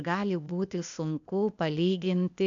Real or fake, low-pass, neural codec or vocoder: fake; 7.2 kHz; codec, 16 kHz, 0.8 kbps, ZipCodec